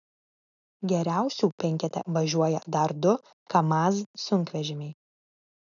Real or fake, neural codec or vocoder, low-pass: real; none; 7.2 kHz